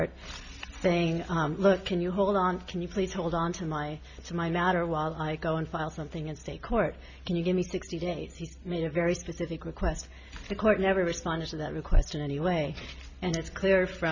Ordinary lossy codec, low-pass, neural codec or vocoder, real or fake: MP3, 48 kbps; 7.2 kHz; none; real